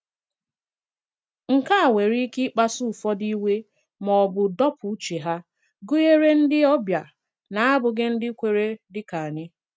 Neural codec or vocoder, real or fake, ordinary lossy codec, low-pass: none; real; none; none